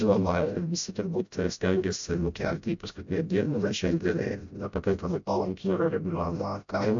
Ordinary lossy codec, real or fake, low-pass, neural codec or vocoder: AAC, 64 kbps; fake; 7.2 kHz; codec, 16 kHz, 0.5 kbps, FreqCodec, smaller model